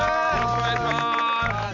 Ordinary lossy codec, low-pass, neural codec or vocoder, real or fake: none; 7.2 kHz; none; real